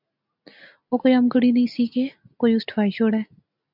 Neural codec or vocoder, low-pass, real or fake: none; 5.4 kHz; real